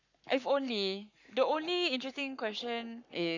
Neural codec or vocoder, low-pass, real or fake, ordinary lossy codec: codec, 44.1 kHz, 7.8 kbps, Pupu-Codec; 7.2 kHz; fake; none